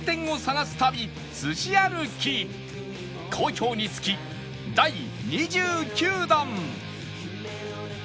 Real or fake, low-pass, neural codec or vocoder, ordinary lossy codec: real; none; none; none